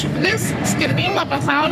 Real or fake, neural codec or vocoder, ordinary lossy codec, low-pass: fake; codec, 44.1 kHz, 3.4 kbps, Pupu-Codec; MP3, 96 kbps; 14.4 kHz